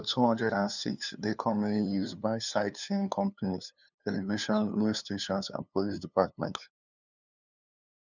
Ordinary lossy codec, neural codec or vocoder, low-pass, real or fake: none; codec, 16 kHz, 2 kbps, FunCodec, trained on LibriTTS, 25 frames a second; 7.2 kHz; fake